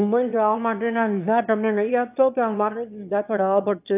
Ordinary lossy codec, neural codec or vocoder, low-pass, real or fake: AAC, 32 kbps; autoencoder, 22.05 kHz, a latent of 192 numbers a frame, VITS, trained on one speaker; 3.6 kHz; fake